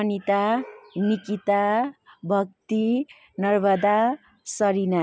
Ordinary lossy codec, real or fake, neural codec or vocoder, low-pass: none; real; none; none